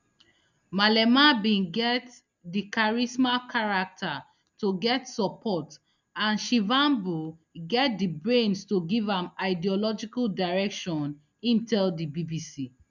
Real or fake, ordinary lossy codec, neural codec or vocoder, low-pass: real; none; none; 7.2 kHz